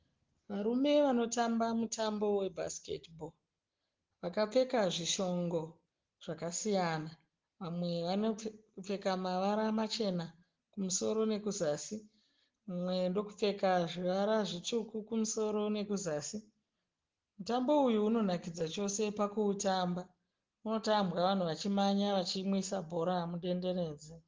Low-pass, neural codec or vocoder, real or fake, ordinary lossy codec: 7.2 kHz; none; real; Opus, 16 kbps